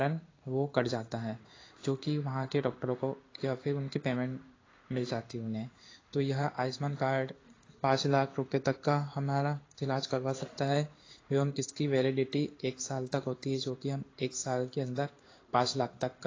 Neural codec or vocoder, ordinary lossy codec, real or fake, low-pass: codec, 16 kHz in and 24 kHz out, 1 kbps, XY-Tokenizer; AAC, 32 kbps; fake; 7.2 kHz